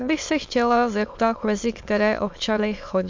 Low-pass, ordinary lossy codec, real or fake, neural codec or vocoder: 7.2 kHz; MP3, 64 kbps; fake; autoencoder, 22.05 kHz, a latent of 192 numbers a frame, VITS, trained on many speakers